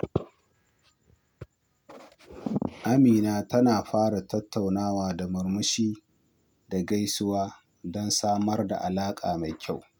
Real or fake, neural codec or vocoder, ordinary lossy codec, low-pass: real; none; none; none